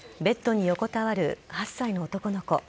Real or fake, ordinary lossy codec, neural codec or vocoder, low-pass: real; none; none; none